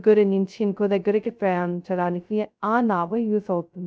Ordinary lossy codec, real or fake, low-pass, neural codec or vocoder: none; fake; none; codec, 16 kHz, 0.2 kbps, FocalCodec